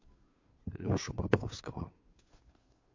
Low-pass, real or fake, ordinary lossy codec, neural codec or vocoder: 7.2 kHz; fake; MP3, 48 kbps; codec, 16 kHz, 2 kbps, FunCodec, trained on Chinese and English, 25 frames a second